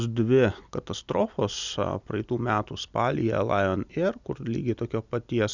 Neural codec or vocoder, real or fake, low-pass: none; real; 7.2 kHz